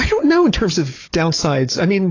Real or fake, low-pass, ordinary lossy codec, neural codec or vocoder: fake; 7.2 kHz; AAC, 32 kbps; codec, 16 kHz, 4 kbps, FunCodec, trained on Chinese and English, 50 frames a second